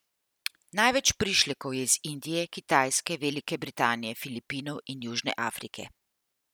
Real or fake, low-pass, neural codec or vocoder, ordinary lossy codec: real; none; none; none